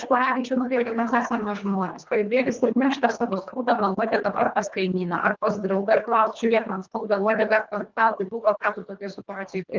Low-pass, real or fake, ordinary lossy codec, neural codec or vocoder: 7.2 kHz; fake; Opus, 24 kbps; codec, 24 kHz, 1.5 kbps, HILCodec